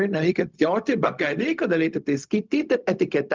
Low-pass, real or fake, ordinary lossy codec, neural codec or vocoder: 7.2 kHz; fake; Opus, 24 kbps; codec, 16 kHz, 0.4 kbps, LongCat-Audio-Codec